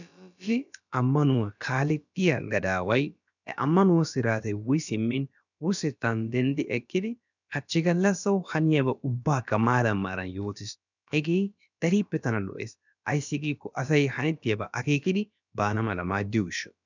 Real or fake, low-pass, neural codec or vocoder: fake; 7.2 kHz; codec, 16 kHz, about 1 kbps, DyCAST, with the encoder's durations